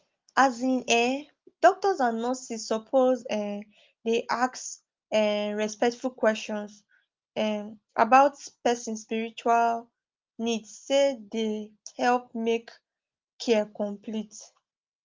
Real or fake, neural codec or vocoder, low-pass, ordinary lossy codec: real; none; 7.2 kHz; Opus, 32 kbps